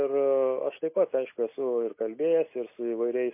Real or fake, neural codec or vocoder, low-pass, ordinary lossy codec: real; none; 3.6 kHz; MP3, 24 kbps